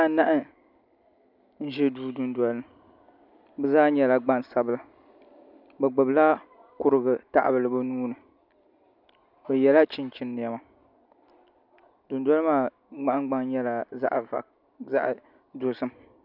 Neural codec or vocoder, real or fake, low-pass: none; real; 5.4 kHz